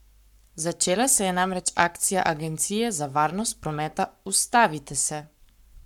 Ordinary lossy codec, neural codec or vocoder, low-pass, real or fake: none; codec, 44.1 kHz, 7.8 kbps, Pupu-Codec; 19.8 kHz; fake